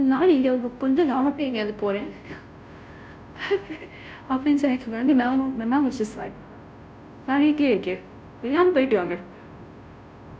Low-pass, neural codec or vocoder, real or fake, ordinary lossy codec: none; codec, 16 kHz, 0.5 kbps, FunCodec, trained on Chinese and English, 25 frames a second; fake; none